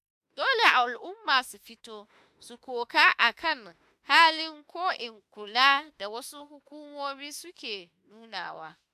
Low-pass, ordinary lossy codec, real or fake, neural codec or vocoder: 14.4 kHz; AAC, 96 kbps; fake; autoencoder, 48 kHz, 32 numbers a frame, DAC-VAE, trained on Japanese speech